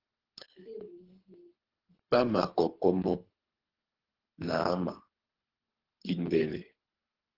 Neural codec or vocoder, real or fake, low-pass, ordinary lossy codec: codec, 24 kHz, 3 kbps, HILCodec; fake; 5.4 kHz; Opus, 16 kbps